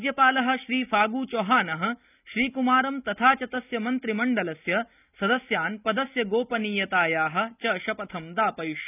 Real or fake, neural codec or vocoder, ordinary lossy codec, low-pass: real; none; none; 3.6 kHz